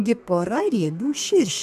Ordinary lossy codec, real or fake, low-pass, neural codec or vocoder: AAC, 96 kbps; fake; 14.4 kHz; codec, 32 kHz, 1.9 kbps, SNAC